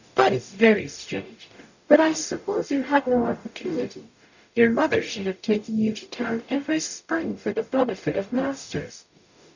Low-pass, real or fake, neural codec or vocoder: 7.2 kHz; fake; codec, 44.1 kHz, 0.9 kbps, DAC